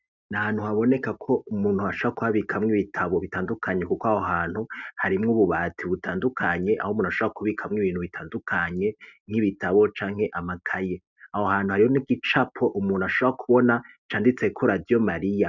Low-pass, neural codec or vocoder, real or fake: 7.2 kHz; none; real